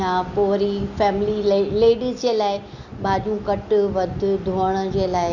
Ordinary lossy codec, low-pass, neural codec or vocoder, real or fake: Opus, 64 kbps; 7.2 kHz; none; real